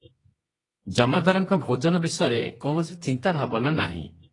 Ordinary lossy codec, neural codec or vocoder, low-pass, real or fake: AAC, 32 kbps; codec, 24 kHz, 0.9 kbps, WavTokenizer, medium music audio release; 10.8 kHz; fake